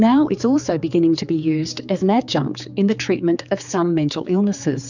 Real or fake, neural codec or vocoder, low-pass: fake; codec, 16 kHz, 4 kbps, X-Codec, HuBERT features, trained on general audio; 7.2 kHz